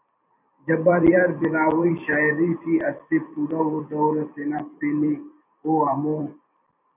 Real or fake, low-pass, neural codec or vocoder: fake; 3.6 kHz; vocoder, 44.1 kHz, 128 mel bands every 256 samples, BigVGAN v2